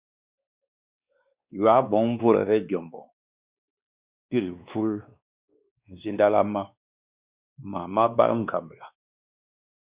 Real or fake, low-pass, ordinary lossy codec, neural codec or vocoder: fake; 3.6 kHz; Opus, 64 kbps; codec, 16 kHz, 2 kbps, X-Codec, WavLM features, trained on Multilingual LibriSpeech